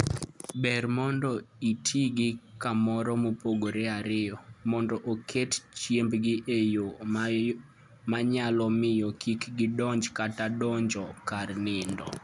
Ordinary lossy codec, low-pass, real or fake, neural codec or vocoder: none; 10.8 kHz; real; none